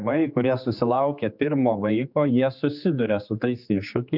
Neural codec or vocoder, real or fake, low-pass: codec, 16 kHz, 4 kbps, X-Codec, HuBERT features, trained on general audio; fake; 5.4 kHz